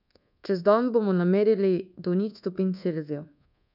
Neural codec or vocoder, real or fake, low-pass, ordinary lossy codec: codec, 24 kHz, 1.2 kbps, DualCodec; fake; 5.4 kHz; none